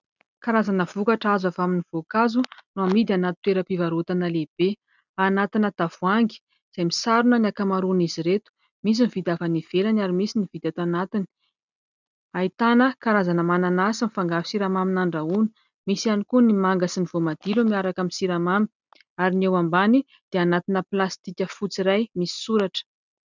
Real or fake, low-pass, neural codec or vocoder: real; 7.2 kHz; none